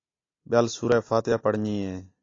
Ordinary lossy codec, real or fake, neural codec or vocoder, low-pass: AAC, 32 kbps; real; none; 7.2 kHz